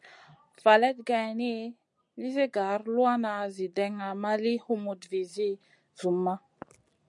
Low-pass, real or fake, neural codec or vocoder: 10.8 kHz; real; none